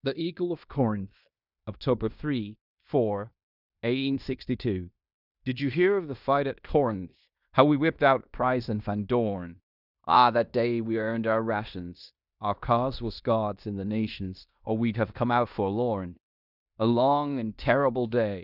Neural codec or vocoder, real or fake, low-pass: codec, 16 kHz in and 24 kHz out, 0.9 kbps, LongCat-Audio-Codec, fine tuned four codebook decoder; fake; 5.4 kHz